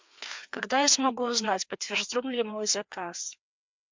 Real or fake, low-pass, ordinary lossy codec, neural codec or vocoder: fake; 7.2 kHz; MP3, 64 kbps; codec, 16 kHz, 2 kbps, FreqCodec, larger model